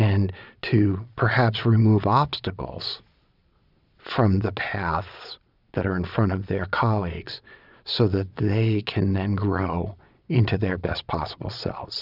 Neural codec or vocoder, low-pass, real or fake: vocoder, 22.05 kHz, 80 mel bands, Vocos; 5.4 kHz; fake